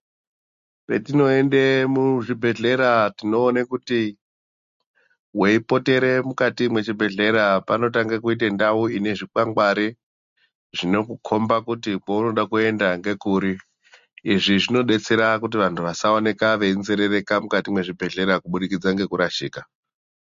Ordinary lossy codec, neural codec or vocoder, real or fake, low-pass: MP3, 48 kbps; none; real; 7.2 kHz